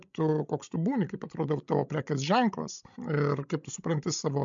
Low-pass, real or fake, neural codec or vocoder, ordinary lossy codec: 7.2 kHz; fake; codec, 16 kHz, 16 kbps, FreqCodec, larger model; MP3, 64 kbps